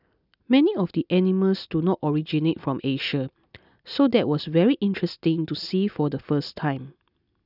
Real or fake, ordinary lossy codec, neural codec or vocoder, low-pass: real; none; none; 5.4 kHz